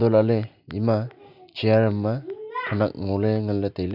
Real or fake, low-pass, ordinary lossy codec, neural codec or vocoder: real; 5.4 kHz; none; none